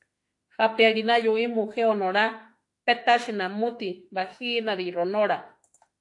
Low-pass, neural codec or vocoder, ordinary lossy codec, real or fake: 10.8 kHz; autoencoder, 48 kHz, 32 numbers a frame, DAC-VAE, trained on Japanese speech; AAC, 48 kbps; fake